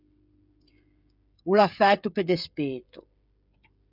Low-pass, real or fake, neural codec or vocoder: 5.4 kHz; fake; codec, 16 kHz, 16 kbps, FreqCodec, smaller model